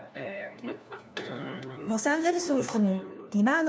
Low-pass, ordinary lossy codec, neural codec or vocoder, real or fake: none; none; codec, 16 kHz, 1 kbps, FunCodec, trained on LibriTTS, 50 frames a second; fake